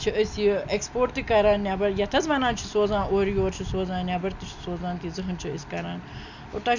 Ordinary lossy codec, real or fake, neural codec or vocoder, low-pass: none; real; none; 7.2 kHz